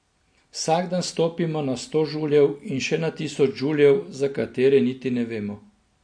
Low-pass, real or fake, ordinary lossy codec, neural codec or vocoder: 9.9 kHz; real; MP3, 48 kbps; none